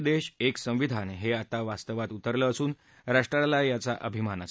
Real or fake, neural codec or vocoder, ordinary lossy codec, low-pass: real; none; none; none